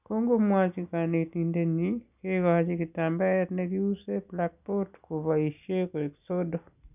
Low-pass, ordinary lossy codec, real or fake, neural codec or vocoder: 3.6 kHz; none; real; none